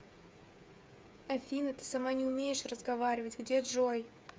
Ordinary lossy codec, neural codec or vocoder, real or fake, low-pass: none; codec, 16 kHz, 8 kbps, FreqCodec, smaller model; fake; none